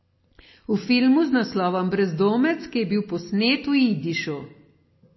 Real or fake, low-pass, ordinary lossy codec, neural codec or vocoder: real; 7.2 kHz; MP3, 24 kbps; none